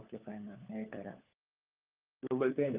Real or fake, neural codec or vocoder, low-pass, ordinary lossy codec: fake; codec, 16 kHz, 4 kbps, FunCodec, trained on LibriTTS, 50 frames a second; 3.6 kHz; Opus, 32 kbps